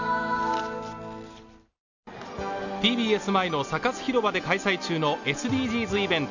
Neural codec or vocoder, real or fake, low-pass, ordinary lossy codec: none; real; 7.2 kHz; none